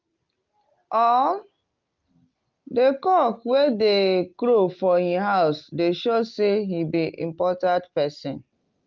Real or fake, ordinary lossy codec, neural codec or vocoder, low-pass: real; Opus, 24 kbps; none; 7.2 kHz